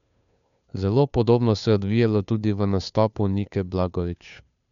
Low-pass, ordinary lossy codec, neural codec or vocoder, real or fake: 7.2 kHz; none; codec, 16 kHz, 2 kbps, FunCodec, trained on Chinese and English, 25 frames a second; fake